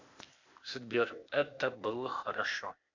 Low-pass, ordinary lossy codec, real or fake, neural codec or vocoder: 7.2 kHz; MP3, 64 kbps; fake; codec, 16 kHz, 0.8 kbps, ZipCodec